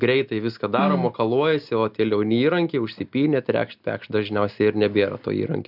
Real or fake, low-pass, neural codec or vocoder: real; 5.4 kHz; none